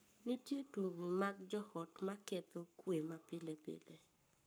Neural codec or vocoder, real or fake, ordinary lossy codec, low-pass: codec, 44.1 kHz, 7.8 kbps, Pupu-Codec; fake; none; none